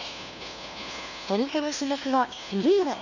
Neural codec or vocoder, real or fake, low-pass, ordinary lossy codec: codec, 16 kHz, 1 kbps, FunCodec, trained on LibriTTS, 50 frames a second; fake; 7.2 kHz; none